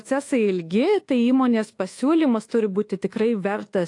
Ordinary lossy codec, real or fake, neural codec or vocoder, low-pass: AAC, 48 kbps; fake; codec, 24 kHz, 1.2 kbps, DualCodec; 10.8 kHz